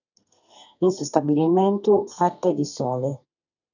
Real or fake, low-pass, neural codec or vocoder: fake; 7.2 kHz; codec, 32 kHz, 1.9 kbps, SNAC